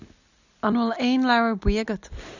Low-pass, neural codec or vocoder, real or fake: 7.2 kHz; none; real